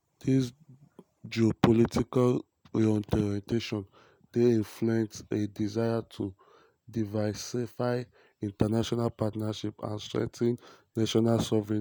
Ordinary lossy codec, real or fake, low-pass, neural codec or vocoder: none; real; none; none